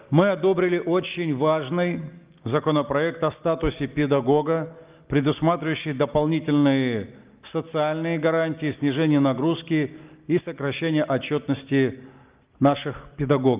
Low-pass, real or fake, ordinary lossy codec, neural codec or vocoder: 3.6 kHz; real; Opus, 32 kbps; none